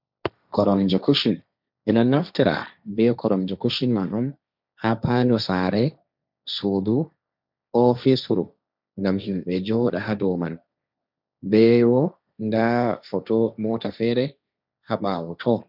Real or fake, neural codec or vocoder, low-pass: fake; codec, 16 kHz, 1.1 kbps, Voila-Tokenizer; 5.4 kHz